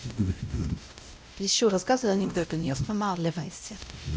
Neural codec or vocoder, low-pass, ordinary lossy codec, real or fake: codec, 16 kHz, 0.5 kbps, X-Codec, WavLM features, trained on Multilingual LibriSpeech; none; none; fake